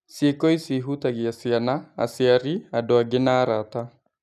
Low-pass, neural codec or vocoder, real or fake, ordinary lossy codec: 14.4 kHz; none; real; none